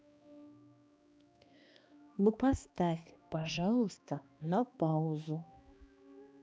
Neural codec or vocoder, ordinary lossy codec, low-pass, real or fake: codec, 16 kHz, 2 kbps, X-Codec, HuBERT features, trained on balanced general audio; none; none; fake